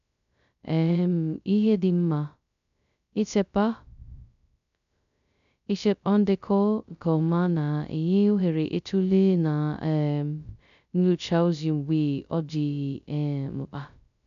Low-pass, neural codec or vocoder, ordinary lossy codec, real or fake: 7.2 kHz; codec, 16 kHz, 0.2 kbps, FocalCodec; none; fake